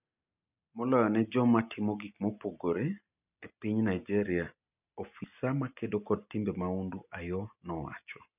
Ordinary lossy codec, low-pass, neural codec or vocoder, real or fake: none; 3.6 kHz; none; real